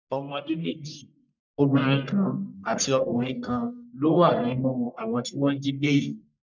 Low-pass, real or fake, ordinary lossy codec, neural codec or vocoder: 7.2 kHz; fake; none; codec, 44.1 kHz, 1.7 kbps, Pupu-Codec